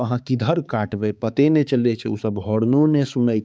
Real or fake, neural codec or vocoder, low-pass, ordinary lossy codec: fake; codec, 16 kHz, 4 kbps, X-Codec, HuBERT features, trained on balanced general audio; none; none